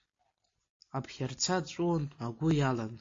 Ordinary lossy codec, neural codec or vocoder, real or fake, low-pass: AAC, 32 kbps; none; real; 7.2 kHz